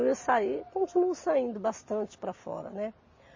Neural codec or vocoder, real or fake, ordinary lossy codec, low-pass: none; real; MP3, 32 kbps; 7.2 kHz